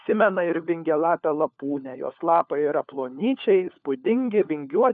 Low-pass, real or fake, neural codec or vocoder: 7.2 kHz; fake; codec, 16 kHz, 4 kbps, FunCodec, trained on LibriTTS, 50 frames a second